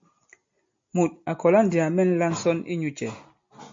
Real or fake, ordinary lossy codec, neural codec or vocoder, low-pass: real; MP3, 48 kbps; none; 7.2 kHz